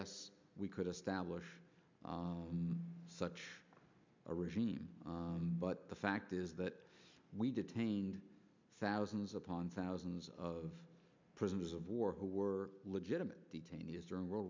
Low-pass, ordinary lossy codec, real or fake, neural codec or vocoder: 7.2 kHz; MP3, 64 kbps; real; none